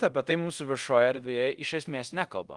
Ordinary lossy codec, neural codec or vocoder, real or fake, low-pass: Opus, 24 kbps; codec, 24 kHz, 0.5 kbps, DualCodec; fake; 10.8 kHz